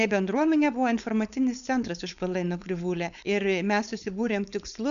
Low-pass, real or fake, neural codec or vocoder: 7.2 kHz; fake; codec, 16 kHz, 4.8 kbps, FACodec